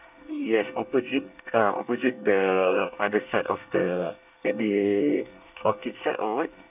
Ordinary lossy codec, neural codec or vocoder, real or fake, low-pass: none; codec, 24 kHz, 1 kbps, SNAC; fake; 3.6 kHz